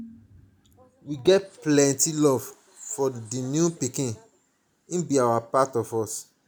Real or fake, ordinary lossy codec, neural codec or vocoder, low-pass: real; none; none; none